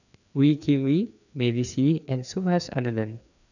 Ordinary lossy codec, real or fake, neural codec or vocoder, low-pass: none; fake; codec, 16 kHz, 2 kbps, FreqCodec, larger model; 7.2 kHz